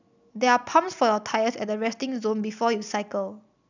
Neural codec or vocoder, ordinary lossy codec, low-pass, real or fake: none; none; 7.2 kHz; real